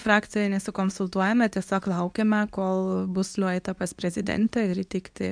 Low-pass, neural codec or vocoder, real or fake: 9.9 kHz; codec, 24 kHz, 0.9 kbps, WavTokenizer, medium speech release version 2; fake